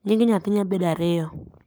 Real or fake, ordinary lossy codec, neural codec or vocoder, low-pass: fake; none; codec, 44.1 kHz, 7.8 kbps, Pupu-Codec; none